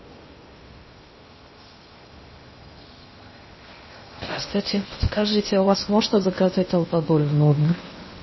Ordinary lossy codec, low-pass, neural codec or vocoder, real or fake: MP3, 24 kbps; 7.2 kHz; codec, 16 kHz in and 24 kHz out, 0.6 kbps, FocalCodec, streaming, 2048 codes; fake